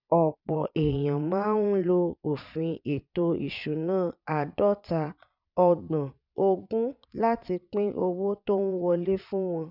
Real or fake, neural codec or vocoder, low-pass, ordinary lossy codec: fake; vocoder, 22.05 kHz, 80 mel bands, Vocos; 5.4 kHz; none